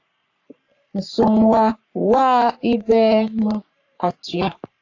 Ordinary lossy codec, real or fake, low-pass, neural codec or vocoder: AAC, 48 kbps; fake; 7.2 kHz; codec, 44.1 kHz, 3.4 kbps, Pupu-Codec